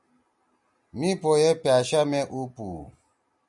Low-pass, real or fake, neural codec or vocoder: 10.8 kHz; real; none